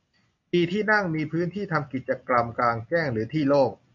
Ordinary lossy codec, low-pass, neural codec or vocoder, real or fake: MP3, 48 kbps; 7.2 kHz; none; real